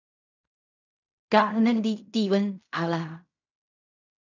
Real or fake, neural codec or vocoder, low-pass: fake; codec, 16 kHz in and 24 kHz out, 0.4 kbps, LongCat-Audio-Codec, fine tuned four codebook decoder; 7.2 kHz